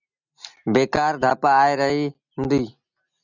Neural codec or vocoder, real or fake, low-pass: none; real; 7.2 kHz